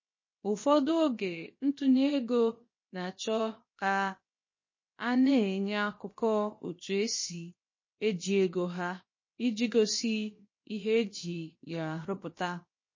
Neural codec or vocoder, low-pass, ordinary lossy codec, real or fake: codec, 16 kHz, 0.7 kbps, FocalCodec; 7.2 kHz; MP3, 32 kbps; fake